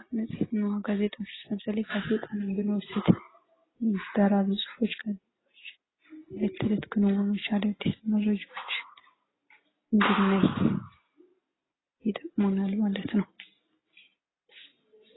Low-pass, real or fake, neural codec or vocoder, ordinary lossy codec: 7.2 kHz; real; none; AAC, 16 kbps